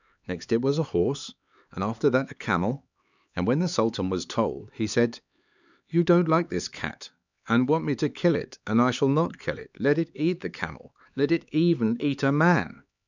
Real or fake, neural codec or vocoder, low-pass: fake; codec, 16 kHz, 4 kbps, X-Codec, HuBERT features, trained on LibriSpeech; 7.2 kHz